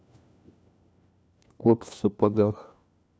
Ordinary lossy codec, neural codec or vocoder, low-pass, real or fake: none; codec, 16 kHz, 1 kbps, FunCodec, trained on LibriTTS, 50 frames a second; none; fake